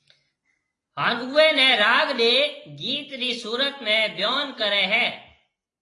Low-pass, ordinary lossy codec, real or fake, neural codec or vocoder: 10.8 kHz; AAC, 32 kbps; real; none